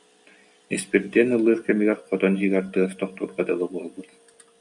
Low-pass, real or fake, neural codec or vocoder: 10.8 kHz; real; none